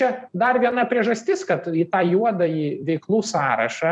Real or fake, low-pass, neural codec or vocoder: fake; 10.8 kHz; vocoder, 44.1 kHz, 128 mel bands every 256 samples, BigVGAN v2